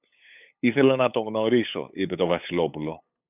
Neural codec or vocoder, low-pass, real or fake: codec, 16 kHz, 8 kbps, FunCodec, trained on LibriTTS, 25 frames a second; 3.6 kHz; fake